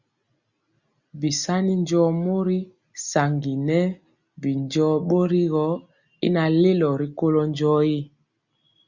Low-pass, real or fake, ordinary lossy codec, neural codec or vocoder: 7.2 kHz; real; Opus, 64 kbps; none